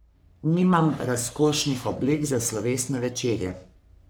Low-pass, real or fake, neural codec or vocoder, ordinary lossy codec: none; fake; codec, 44.1 kHz, 3.4 kbps, Pupu-Codec; none